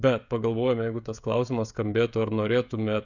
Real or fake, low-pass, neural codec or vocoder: fake; 7.2 kHz; codec, 16 kHz, 16 kbps, FreqCodec, smaller model